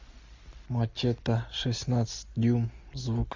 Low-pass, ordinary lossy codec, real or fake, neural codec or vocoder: 7.2 kHz; MP3, 64 kbps; real; none